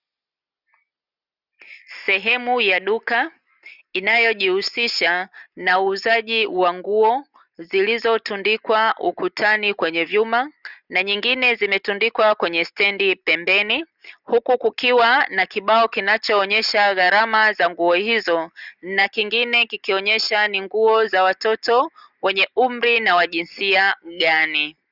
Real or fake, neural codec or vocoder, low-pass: real; none; 5.4 kHz